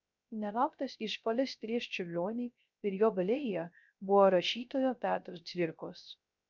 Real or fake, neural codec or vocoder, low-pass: fake; codec, 16 kHz, 0.3 kbps, FocalCodec; 7.2 kHz